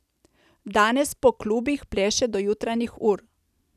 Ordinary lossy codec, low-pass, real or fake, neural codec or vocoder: none; 14.4 kHz; real; none